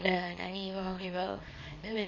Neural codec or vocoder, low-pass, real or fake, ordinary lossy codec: codec, 24 kHz, 0.9 kbps, WavTokenizer, small release; 7.2 kHz; fake; MP3, 32 kbps